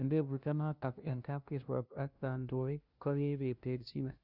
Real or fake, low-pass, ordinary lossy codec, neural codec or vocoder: fake; 5.4 kHz; Opus, 64 kbps; codec, 16 kHz, 0.5 kbps, FunCodec, trained on LibriTTS, 25 frames a second